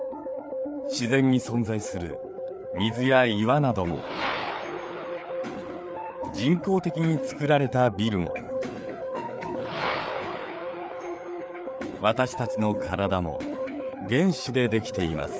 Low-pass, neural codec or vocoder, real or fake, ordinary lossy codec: none; codec, 16 kHz, 4 kbps, FreqCodec, larger model; fake; none